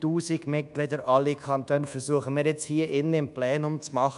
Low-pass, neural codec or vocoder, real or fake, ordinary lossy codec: 10.8 kHz; codec, 24 kHz, 1.2 kbps, DualCodec; fake; none